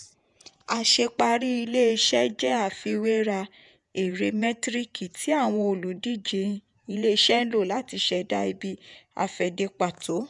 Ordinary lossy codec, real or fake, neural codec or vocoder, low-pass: none; fake; vocoder, 48 kHz, 128 mel bands, Vocos; 10.8 kHz